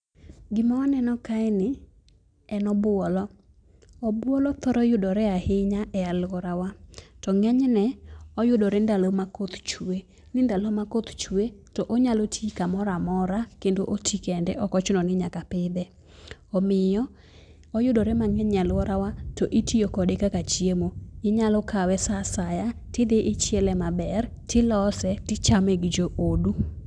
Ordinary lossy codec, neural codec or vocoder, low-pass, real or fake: none; none; 9.9 kHz; real